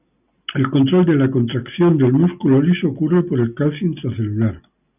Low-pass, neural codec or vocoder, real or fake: 3.6 kHz; none; real